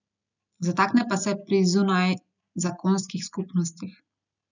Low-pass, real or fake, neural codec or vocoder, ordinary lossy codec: 7.2 kHz; real; none; none